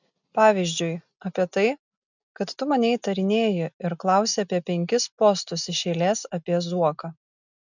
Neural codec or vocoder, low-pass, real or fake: none; 7.2 kHz; real